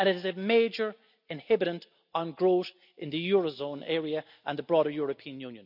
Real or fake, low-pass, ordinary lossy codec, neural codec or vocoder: real; 5.4 kHz; none; none